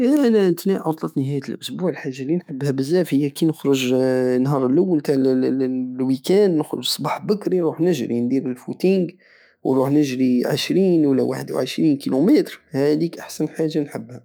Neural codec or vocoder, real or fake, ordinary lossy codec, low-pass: autoencoder, 48 kHz, 128 numbers a frame, DAC-VAE, trained on Japanese speech; fake; none; none